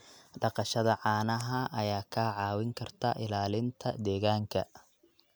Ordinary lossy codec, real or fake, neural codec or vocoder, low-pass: none; real; none; none